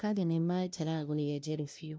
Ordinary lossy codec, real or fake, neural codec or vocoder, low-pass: none; fake; codec, 16 kHz, 0.5 kbps, FunCodec, trained on LibriTTS, 25 frames a second; none